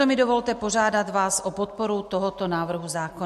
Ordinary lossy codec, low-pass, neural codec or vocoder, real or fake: MP3, 64 kbps; 14.4 kHz; none; real